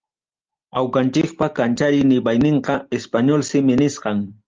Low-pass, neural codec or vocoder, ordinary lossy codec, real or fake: 7.2 kHz; none; Opus, 24 kbps; real